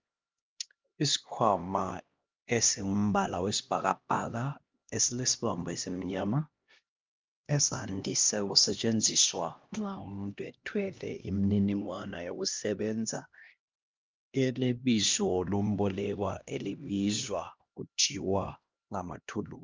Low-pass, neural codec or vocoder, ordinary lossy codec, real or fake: 7.2 kHz; codec, 16 kHz, 1 kbps, X-Codec, HuBERT features, trained on LibriSpeech; Opus, 24 kbps; fake